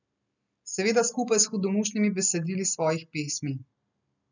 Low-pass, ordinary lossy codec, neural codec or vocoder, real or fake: 7.2 kHz; none; none; real